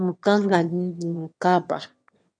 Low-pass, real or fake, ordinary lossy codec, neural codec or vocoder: 9.9 kHz; fake; MP3, 48 kbps; autoencoder, 22.05 kHz, a latent of 192 numbers a frame, VITS, trained on one speaker